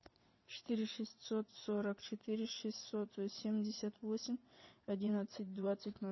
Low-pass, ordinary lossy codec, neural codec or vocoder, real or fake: 7.2 kHz; MP3, 24 kbps; vocoder, 22.05 kHz, 80 mel bands, WaveNeXt; fake